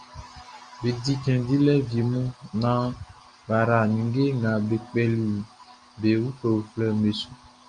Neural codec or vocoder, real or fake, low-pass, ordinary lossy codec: none; real; 9.9 kHz; Opus, 32 kbps